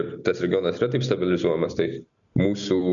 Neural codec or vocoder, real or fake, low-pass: none; real; 7.2 kHz